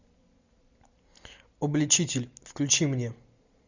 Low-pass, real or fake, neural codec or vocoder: 7.2 kHz; real; none